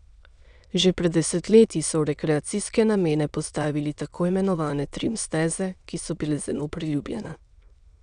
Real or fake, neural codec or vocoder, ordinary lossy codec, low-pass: fake; autoencoder, 22.05 kHz, a latent of 192 numbers a frame, VITS, trained on many speakers; none; 9.9 kHz